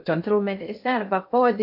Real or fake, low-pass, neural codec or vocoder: fake; 5.4 kHz; codec, 16 kHz in and 24 kHz out, 0.6 kbps, FocalCodec, streaming, 2048 codes